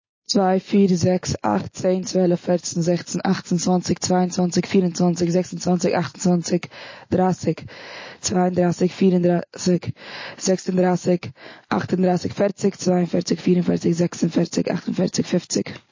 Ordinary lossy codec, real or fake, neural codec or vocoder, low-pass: MP3, 32 kbps; real; none; 7.2 kHz